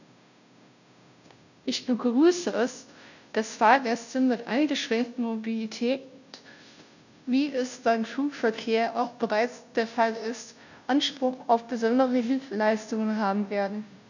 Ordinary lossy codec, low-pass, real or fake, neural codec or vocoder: none; 7.2 kHz; fake; codec, 16 kHz, 0.5 kbps, FunCodec, trained on Chinese and English, 25 frames a second